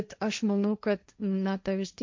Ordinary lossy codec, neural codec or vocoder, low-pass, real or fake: MP3, 64 kbps; codec, 16 kHz, 1.1 kbps, Voila-Tokenizer; 7.2 kHz; fake